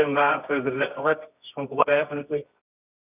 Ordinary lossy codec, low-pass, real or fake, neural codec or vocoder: none; 3.6 kHz; fake; codec, 24 kHz, 0.9 kbps, WavTokenizer, medium music audio release